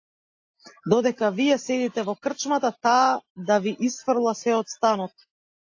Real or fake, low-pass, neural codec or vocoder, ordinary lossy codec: real; 7.2 kHz; none; AAC, 48 kbps